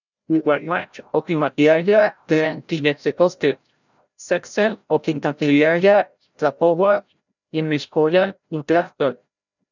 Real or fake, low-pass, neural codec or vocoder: fake; 7.2 kHz; codec, 16 kHz, 0.5 kbps, FreqCodec, larger model